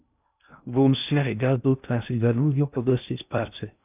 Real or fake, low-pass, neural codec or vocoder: fake; 3.6 kHz; codec, 16 kHz in and 24 kHz out, 0.6 kbps, FocalCodec, streaming, 2048 codes